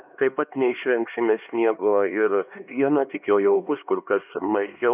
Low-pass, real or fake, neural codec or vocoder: 3.6 kHz; fake; codec, 16 kHz, 2 kbps, X-Codec, HuBERT features, trained on LibriSpeech